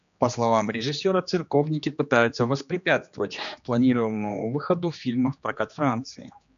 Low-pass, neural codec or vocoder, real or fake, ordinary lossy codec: 7.2 kHz; codec, 16 kHz, 2 kbps, X-Codec, HuBERT features, trained on general audio; fake; MP3, 96 kbps